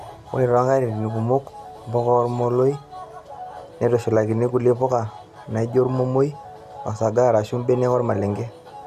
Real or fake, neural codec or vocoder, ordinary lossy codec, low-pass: real; none; none; 14.4 kHz